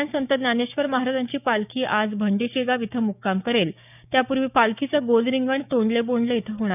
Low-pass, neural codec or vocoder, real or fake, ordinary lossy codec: 3.6 kHz; codec, 16 kHz, 6 kbps, DAC; fake; none